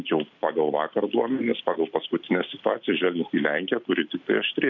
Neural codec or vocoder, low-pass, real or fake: none; 7.2 kHz; real